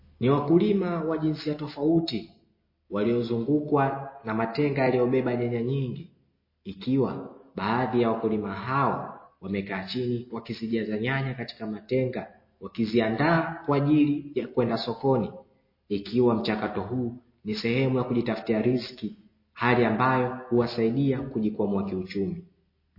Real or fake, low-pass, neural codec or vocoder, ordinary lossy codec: real; 5.4 kHz; none; MP3, 24 kbps